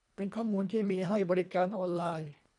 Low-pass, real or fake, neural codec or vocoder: 10.8 kHz; fake; codec, 24 kHz, 1.5 kbps, HILCodec